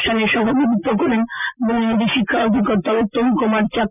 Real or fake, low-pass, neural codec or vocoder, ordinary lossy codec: real; 3.6 kHz; none; none